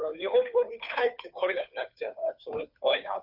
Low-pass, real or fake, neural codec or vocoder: 5.4 kHz; fake; codec, 16 kHz, 2 kbps, FunCodec, trained on Chinese and English, 25 frames a second